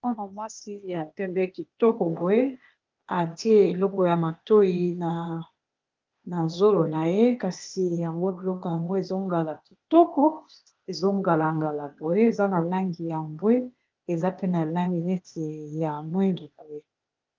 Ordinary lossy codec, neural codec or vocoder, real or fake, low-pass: Opus, 24 kbps; codec, 16 kHz, 0.8 kbps, ZipCodec; fake; 7.2 kHz